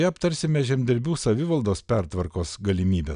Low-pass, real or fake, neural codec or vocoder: 9.9 kHz; real; none